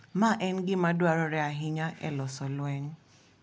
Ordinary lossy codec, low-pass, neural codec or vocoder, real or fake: none; none; none; real